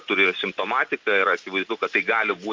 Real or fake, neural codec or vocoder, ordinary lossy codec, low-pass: real; none; Opus, 24 kbps; 7.2 kHz